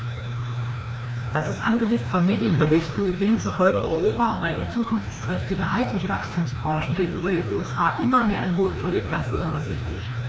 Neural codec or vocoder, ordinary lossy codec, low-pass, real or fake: codec, 16 kHz, 1 kbps, FreqCodec, larger model; none; none; fake